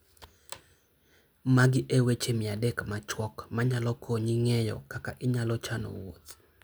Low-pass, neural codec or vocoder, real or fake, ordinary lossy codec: none; none; real; none